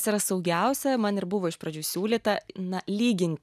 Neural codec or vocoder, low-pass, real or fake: none; 14.4 kHz; real